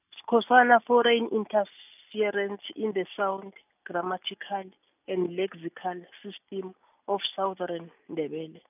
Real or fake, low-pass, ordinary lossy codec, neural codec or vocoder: real; 3.6 kHz; none; none